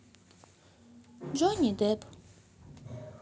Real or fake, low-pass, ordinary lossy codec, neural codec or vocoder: real; none; none; none